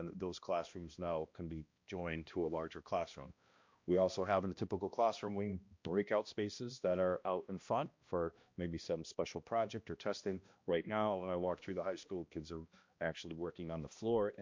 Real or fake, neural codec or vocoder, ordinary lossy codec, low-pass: fake; codec, 16 kHz, 1 kbps, X-Codec, HuBERT features, trained on balanced general audio; MP3, 48 kbps; 7.2 kHz